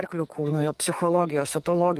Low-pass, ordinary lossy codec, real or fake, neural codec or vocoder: 14.4 kHz; Opus, 32 kbps; fake; codec, 44.1 kHz, 2.6 kbps, SNAC